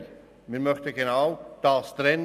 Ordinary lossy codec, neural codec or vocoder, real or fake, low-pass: none; none; real; 14.4 kHz